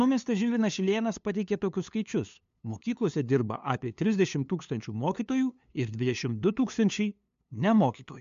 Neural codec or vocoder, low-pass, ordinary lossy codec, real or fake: codec, 16 kHz, 2 kbps, FunCodec, trained on LibriTTS, 25 frames a second; 7.2 kHz; MP3, 64 kbps; fake